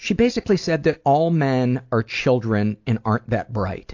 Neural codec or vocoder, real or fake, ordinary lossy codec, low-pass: none; real; AAC, 48 kbps; 7.2 kHz